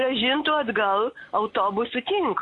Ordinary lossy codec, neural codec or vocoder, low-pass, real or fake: AAC, 48 kbps; none; 10.8 kHz; real